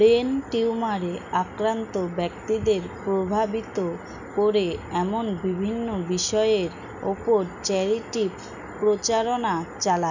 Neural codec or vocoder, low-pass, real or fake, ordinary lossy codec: none; 7.2 kHz; real; none